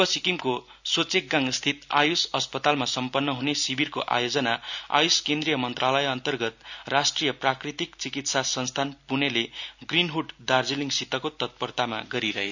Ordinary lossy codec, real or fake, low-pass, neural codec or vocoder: none; real; 7.2 kHz; none